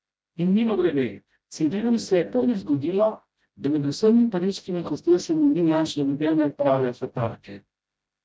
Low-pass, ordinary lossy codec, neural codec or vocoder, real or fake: none; none; codec, 16 kHz, 0.5 kbps, FreqCodec, smaller model; fake